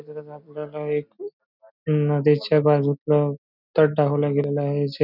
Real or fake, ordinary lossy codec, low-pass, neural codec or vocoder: real; none; 5.4 kHz; none